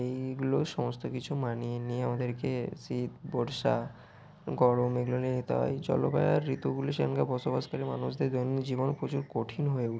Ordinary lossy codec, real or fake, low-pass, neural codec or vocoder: none; real; none; none